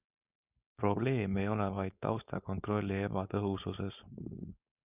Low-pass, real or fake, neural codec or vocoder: 3.6 kHz; fake; codec, 16 kHz, 4.8 kbps, FACodec